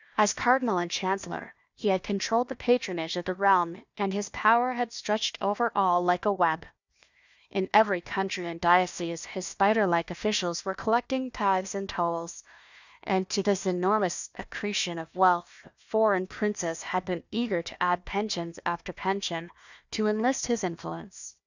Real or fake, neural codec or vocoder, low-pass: fake; codec, 16 kHz, 1 kbps, FunCodec, trained on Chinese and English, 50 frames a second; 7.2 kHz